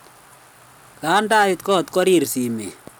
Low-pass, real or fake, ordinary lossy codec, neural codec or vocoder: none; real; none; none